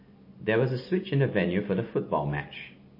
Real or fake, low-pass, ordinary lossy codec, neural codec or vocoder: real; 5.4 kHz; MP3, 24 kbps; none